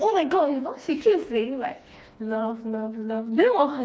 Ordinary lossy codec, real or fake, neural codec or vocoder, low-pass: none; fake; codec, 16 kHz, 2 kbps, FreqCodec, smaller model; none